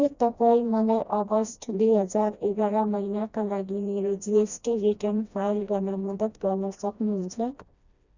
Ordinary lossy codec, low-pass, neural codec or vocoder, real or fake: Opus, 64 kbps; 7.2 kHz; codec, 16 kHz, 1 kbps, FreqCodec, smaller model; fake